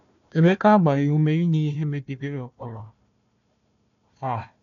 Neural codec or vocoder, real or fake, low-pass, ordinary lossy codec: codec, 16 kHz, 1 kbps, FunCodec, trained on Chinese and English, 50 frames a second; fake; 7.2 kHz; none